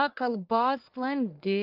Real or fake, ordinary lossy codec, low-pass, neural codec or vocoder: fake; Opus, 24 kbps; 5.4 kHz; codec, 44.1 kHz, 1.7 kbps, Pupu-Codec